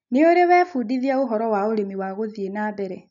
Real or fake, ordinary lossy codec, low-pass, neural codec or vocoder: real; none; 7.2 kHz; none